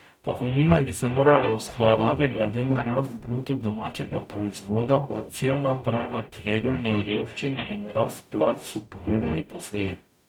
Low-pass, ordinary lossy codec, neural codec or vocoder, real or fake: 19.8 kHz; none; codec, 44.1 kHz, 0.9 kbps, DAC; fake